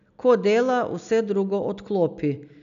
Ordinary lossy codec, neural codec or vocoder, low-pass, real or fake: none; none; 7.2 kHz; real